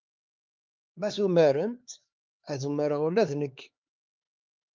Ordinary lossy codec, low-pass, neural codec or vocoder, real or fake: Opus, 24 kbps; 7.2 kHz; codec, 16 kHz, 4 kbps, X-Codec, HuBERT features, trained on LibriSpeech; fake